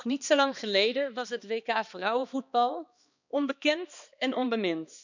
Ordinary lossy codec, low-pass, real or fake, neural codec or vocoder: none; 7.2 kHz; fake; codec, 16 kHz, 2 kbps, X-Codec, HuBERT features, trained on balanced general audio